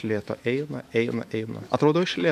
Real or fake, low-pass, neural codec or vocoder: fake; 14.4 kHz; autoencoder, 48 kHz, 128 numbers a frame, DAC-VAE, trained on Japanese speech